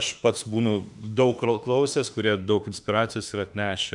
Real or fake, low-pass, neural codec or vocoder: fake; 10.8 kHz; autoencoder, 48 kHz, 32 numbers a frame, DAC-VAE, trained on Japanese speech